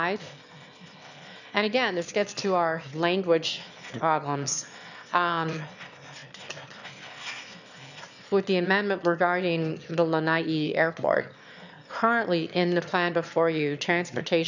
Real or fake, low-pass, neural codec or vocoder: fake; 7.2 kHz; autoencoder, 22.05 kHz, a latent of 192 numbers a frame, VITS, trained on one speaker